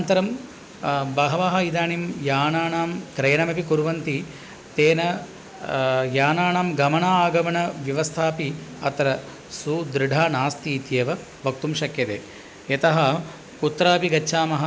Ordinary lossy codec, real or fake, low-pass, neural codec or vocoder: none; real; none; none